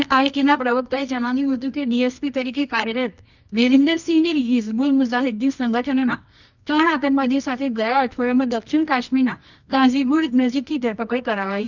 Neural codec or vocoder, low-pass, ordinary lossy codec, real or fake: codec, 24 kHz, 0.9 kbps, WavTokenizer, medium music audio release; 7.2 kHz; none; fake